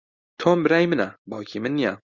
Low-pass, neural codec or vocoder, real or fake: 7.2 kHz; none; real